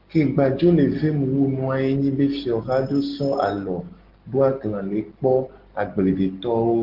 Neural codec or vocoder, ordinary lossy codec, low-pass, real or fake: none; Opus, 16 kbps; 5.4 kHz; real